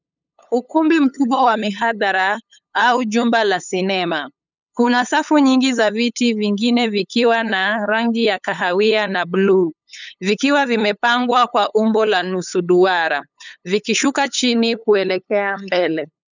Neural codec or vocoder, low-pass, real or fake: codec, 16 kHz, 8 kbps, FunCodec, trained on LibriTTS, 25 frames a second; 7.2 kHz; fake